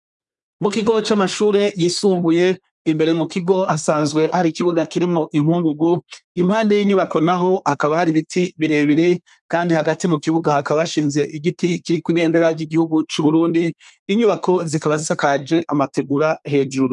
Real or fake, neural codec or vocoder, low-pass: fake; codec, 24 kHz, 1 kbps, SNAC; 10.8 kHz